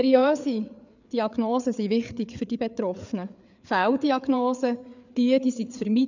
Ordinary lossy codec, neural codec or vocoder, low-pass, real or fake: none; codec, 16 kHz, 4 kbps, FreqCodec, larger model; 7.2 kHz; fake